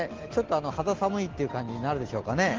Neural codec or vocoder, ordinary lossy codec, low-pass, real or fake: none; Opus, 16 kbps; 7.2 kHz; real